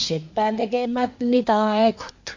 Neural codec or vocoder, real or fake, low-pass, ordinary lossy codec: codec, 24 kHz, 1 kbps, SNAC; fake; 7.2 kHz; MP3, 48 kbps